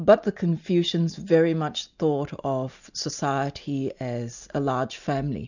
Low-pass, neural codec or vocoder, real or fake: 7.2 kHz; none; real